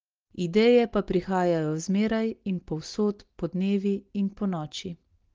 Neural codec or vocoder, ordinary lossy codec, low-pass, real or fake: none; Opus, 16 kbps; 7.2 kHz; real